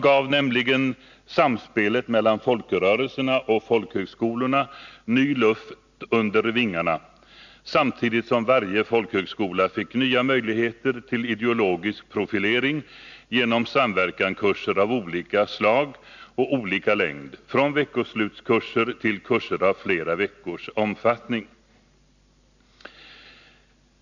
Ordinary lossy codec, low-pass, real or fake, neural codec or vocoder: none; 7.2 kHz; real; none